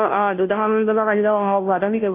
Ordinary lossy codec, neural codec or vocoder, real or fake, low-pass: AAC, 24 kbps; codec, 16 kHz, 0.5 kbps, FunCodec, trained on Chinese and English, 25 frames a second; fake; 3.6 kHz